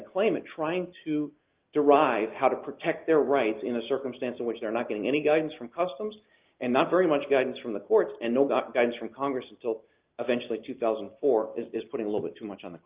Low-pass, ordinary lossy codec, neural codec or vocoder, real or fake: 3.6 kHz; Opus, 32 kbps; none; real